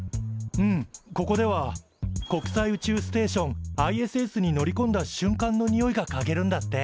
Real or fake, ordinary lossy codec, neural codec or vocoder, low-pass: real; none; none; none